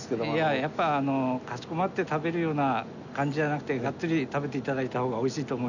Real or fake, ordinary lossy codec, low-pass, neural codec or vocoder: real; none; 7.2 kHz; none